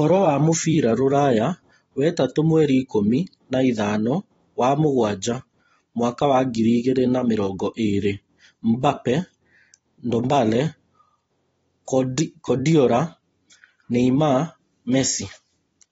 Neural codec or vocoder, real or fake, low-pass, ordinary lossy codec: vocoder, 44.1 kHz, 128 mel bands every 256 samples, BigVGAN v2; fake; 19.8 kHz; AAC, 24 kbps